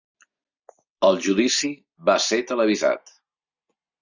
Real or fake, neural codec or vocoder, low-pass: real; none; 7.2 kHz